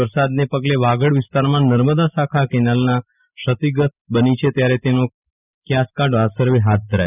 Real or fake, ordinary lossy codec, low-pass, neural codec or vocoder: real; none; 3.6 kHz; none